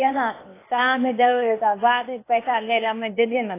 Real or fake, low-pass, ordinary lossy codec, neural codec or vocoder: fake; 3.6 kHz; AAC, 24 kbps; codec, 16 kHz, 0.8 kbps, ZipCodec